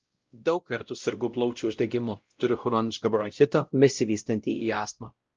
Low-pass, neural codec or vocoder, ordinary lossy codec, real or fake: 7.2 kHz; codec, 16 kHz, 0.5 kbps, X-Codec, WavLM features, trained on Multilingual LibriSpeech; Opus, 24 kbps; fake